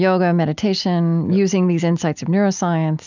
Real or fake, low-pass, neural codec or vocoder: real; 7.2 kHz; none